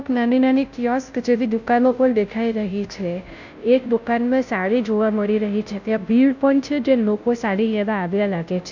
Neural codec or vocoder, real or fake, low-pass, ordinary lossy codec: codec, 16 kHz, 0.5 kbps, FunCodec, trained on Chinese and English, 25 frames a second; fake; 7.2 kHz; none